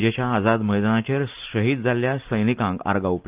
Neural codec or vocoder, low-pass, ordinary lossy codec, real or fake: none; 3.6 kHz; Opus, 24 kbps; real